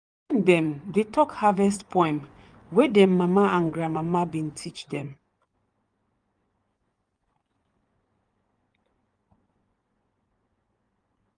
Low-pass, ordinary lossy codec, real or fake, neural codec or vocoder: 9.9 kHz; Opus, 32 kbps; fake; vocoder, 24 kHz, 100 mel bands, Vocos